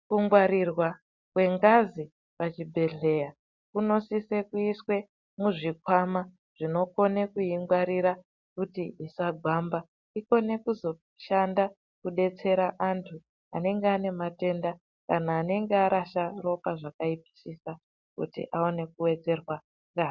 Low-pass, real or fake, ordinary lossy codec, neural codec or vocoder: 7.2 kHz; real; Opus, 64 kbps; none